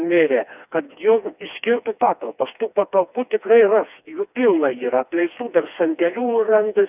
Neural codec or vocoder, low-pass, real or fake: codec, 16 kHz, 2 kbps, FreqCodec, smaller model; 3.6 kHz; fake